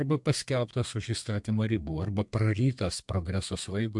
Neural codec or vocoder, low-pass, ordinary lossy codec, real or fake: codec, 32 kHz, 1.9 kbps, SNAC; 10.8 kHz; MP3, 64 kbps; fake